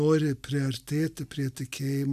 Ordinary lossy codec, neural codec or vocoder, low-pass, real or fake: AAC, 96 kbps; none; 14.4 kHz; real